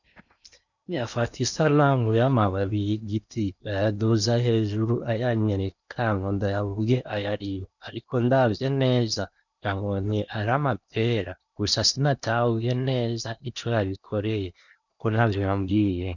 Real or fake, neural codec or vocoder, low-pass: fake; codec, 16 kHz in and 24 kHz out, 0.8 kbps, FocalCodec, streaming, 65536 codes; 7.2 kHz